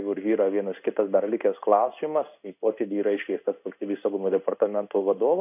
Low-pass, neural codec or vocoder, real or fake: 3.6 kHz; codec, 16 kHz in and 24 kHz out, 1 kbps, XY-Tokenizer; fake